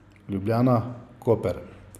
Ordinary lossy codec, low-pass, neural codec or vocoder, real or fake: none; 14.4 kHz; none; real